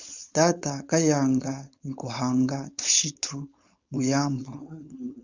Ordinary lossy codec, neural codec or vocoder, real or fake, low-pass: Opus, 64 kbps; codec, 16 kHz, 4.8 kbps, FACodec; fake; 7.2 kHz